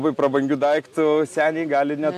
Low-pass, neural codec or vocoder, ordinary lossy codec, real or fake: 14.4 kHz; none; AAC, 64 kbps; real